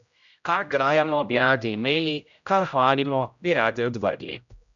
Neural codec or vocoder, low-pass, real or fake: codec, 16 kHz, 0.5 kbps, X-Codec, HuBERT features, trained on general audio; 7.2 kHz; fake